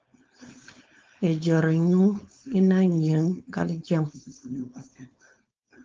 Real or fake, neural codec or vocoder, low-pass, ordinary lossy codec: fake; codec, 16 kHz, 4.8 kbps, FACodec; 7.2 kHz; Opus, 24 kbps